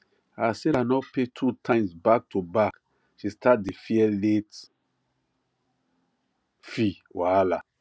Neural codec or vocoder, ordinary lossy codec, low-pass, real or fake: none; none; none; real